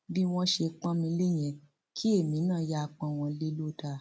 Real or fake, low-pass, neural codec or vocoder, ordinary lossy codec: real; none; none; none